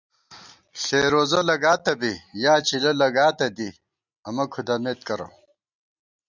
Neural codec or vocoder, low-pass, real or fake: none; 7.2 kHz; real